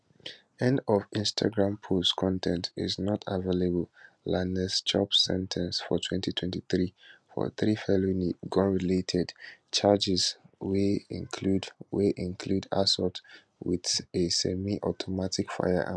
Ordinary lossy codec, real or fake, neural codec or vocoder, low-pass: none; real; none; none